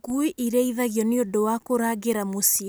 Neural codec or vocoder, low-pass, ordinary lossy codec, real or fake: none; none; none; real